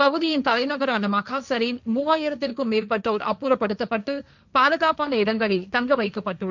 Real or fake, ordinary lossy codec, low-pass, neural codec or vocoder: fake; none; 7.2 kHz; codec, 16 kHz, 1.1 kbps, Voila-Tokenizer